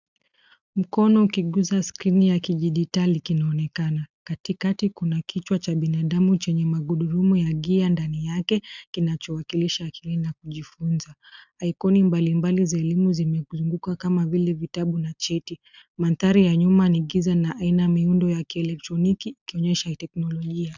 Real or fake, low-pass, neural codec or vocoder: real; 7.2 kHz; none